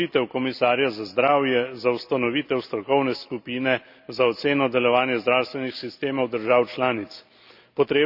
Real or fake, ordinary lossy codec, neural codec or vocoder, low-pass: real; none; none; 5.4 kHz